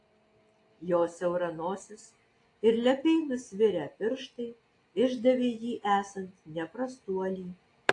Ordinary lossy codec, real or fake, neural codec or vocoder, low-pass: AAC, 48 kbps; real; none; 10.8 kHz